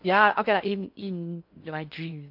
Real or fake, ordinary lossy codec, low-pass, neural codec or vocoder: fake; AAC, 48 kbps; 5.4 kHz; codec, 16 kHz in and 24 kHz out, 0.6 kbps, FocalCodec, streaming, 4096 codes